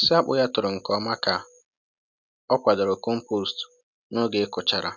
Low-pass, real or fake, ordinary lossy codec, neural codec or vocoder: 7.2 kHz; real; none; none